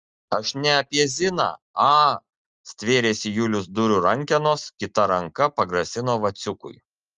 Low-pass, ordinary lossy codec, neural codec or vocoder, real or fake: 7.2 kHz; Opus, 24 kbps; none; real